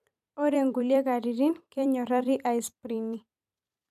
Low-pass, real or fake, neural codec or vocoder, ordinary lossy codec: 14.4 kHz; fake; vocoder, 44.1 kHz, 128 mel bands every 256 samples, BigVGAN v2; none